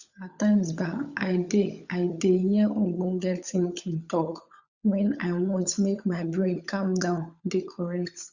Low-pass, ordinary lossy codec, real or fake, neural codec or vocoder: 7.2 kHz; Opus, 64 kbps; fake; codec, 16 kHz, 16 kbps, FunCodec, trained on LibriTTS, 50 frames a second